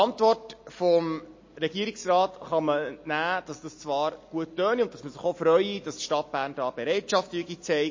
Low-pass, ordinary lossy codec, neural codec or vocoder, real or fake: 7.2 kHz; MP3, 32 kbps; none; real